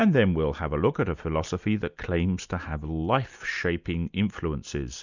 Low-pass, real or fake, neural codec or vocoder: 7.2 kHz; real; none